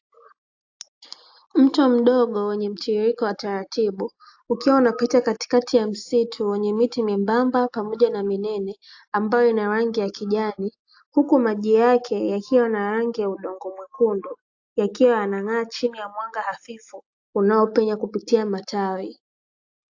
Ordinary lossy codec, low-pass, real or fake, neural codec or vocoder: AAC, 48 kbps; 7.2 kHz; real; none